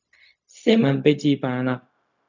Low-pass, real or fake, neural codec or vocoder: 7.2 kHz; fake; codec, 16 kHz, 0.4 kbps, LongCat-Audio-Codec